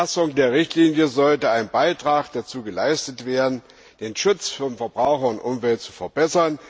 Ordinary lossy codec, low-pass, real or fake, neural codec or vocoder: none; none; real; none